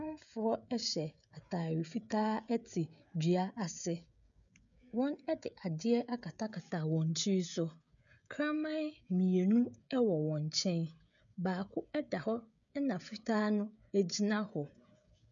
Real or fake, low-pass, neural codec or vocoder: fake; 7.2 kHz; codec, 16 kHz, 16 kbps, FreqCodec, smaller model